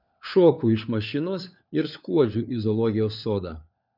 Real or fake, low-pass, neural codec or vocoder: fake; 5.4 kHz; codec, 16 kHz, 4 kbps, FunCodec, trained on LibriTTS, 50 frames a second